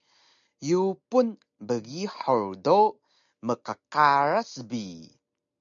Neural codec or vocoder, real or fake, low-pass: none; real; 7.2 kHz